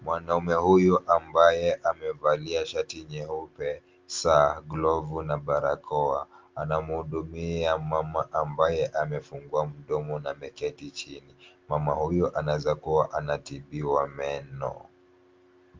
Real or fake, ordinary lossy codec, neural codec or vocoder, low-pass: real; Opus, 24 kbps; none; 7.2 kHz